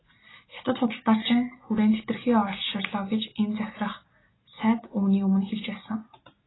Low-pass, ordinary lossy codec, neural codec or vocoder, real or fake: 7.2 kHz; AAC, 16 kbps; none; real